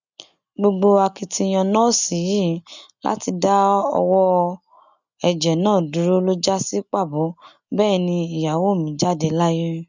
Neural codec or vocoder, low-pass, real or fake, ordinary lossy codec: none; 7.2 kHz; real; none